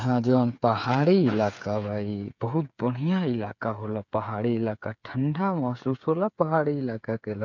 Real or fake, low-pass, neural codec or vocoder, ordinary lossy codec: fake; 7.2 kHz; codec, 16 kHz, 8 kbps, FreqCodec, smaller model; none